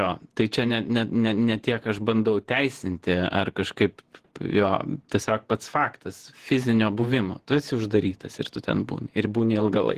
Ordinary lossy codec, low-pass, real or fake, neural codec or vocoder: Opus, 16 kbps; 14.4 kHz; fake; vocoder, 48 kHz, 128 mel bands, Vocos